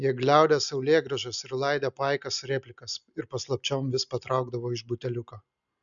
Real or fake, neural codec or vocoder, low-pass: real; none; 7.2 kHz